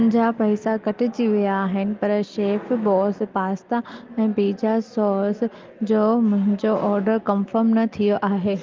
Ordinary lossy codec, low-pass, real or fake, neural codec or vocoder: Opus, 32 kbps; 7.2 kHz; real; none